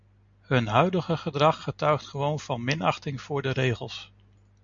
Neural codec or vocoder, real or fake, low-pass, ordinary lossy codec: none; real; 7.2 kHz; MP3, 64 kbps